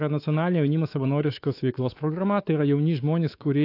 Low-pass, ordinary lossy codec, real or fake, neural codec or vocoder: 5.4 kHz; AAC, 32 kbps; fake; codec, 24 kHz, 3.1 kbps, DualCodec